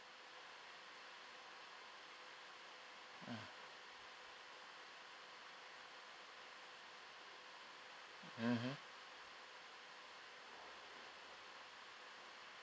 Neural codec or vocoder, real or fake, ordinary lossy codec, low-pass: none; real; none; none